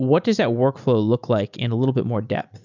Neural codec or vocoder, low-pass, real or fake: none; 7.2 kHz; real